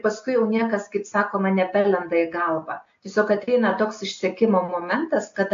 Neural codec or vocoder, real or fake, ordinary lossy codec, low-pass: none; real; AAC, 48 kbps; 7.2 kHz